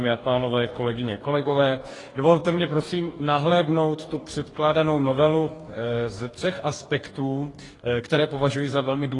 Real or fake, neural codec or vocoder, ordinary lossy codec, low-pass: fake; codec, 44.1 kHz, 2.6 kbps, DAC; AAC, 32 kbps; 10.8 kHz